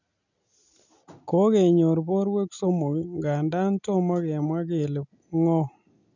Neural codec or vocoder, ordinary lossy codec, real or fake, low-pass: none; none; real; 7.2 kHz